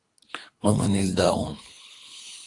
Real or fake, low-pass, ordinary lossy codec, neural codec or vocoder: fake; 10.8 kHz; AAC, 48 kbps; codec, 24 kHz, 3 kbps, HILCodec